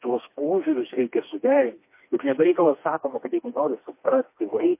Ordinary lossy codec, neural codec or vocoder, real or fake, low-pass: MP3, 24 kbps; codec, 16 kHz, 2 kbps, FreqCodec, smaller model; fake; 3.6 kHz